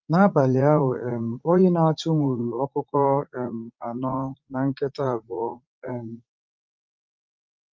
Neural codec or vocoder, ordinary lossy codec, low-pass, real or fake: vocoder, 44.1 kHz, 80 mel bands, Vocos; Opus, 24 kbps; 7.2 kHz; fake